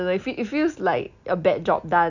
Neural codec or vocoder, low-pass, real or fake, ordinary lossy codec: none; 7.2 kHz; real; none